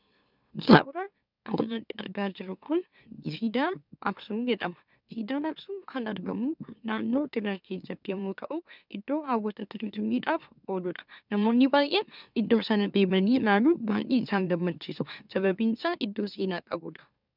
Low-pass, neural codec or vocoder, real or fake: 5.4 kHz; autoencoder, 44.1 kHz, a latent of 192 numbers a frame, MeloTTS; fake